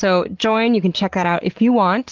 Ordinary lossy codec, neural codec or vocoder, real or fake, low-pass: Opus, 16 kbps; none; real; 7.2 kHz